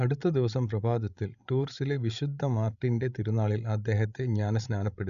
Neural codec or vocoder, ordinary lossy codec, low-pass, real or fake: codec, 16 kHz, 16 kbps, FreqCodec, larger model; none; 7.2 kHz; fake